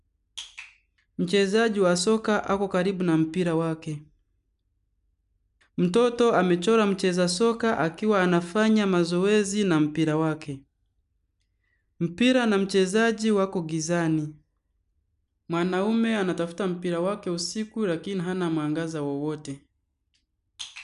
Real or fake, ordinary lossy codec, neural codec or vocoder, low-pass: real; none; none; 10.8 kHz